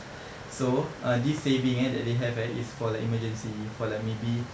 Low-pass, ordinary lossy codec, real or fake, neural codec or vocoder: none; none; real; none